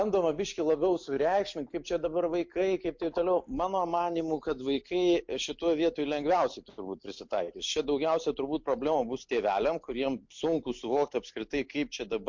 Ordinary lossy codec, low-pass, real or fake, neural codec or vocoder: MP3, 48 kbps; 7.2 kHz; real; none